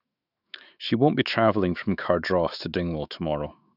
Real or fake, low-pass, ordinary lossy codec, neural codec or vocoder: fake; 5.4 kHz; none; autoencoder, 48 kHz, 128 numbers a frame, DAC-VAE, trained on Japanese speech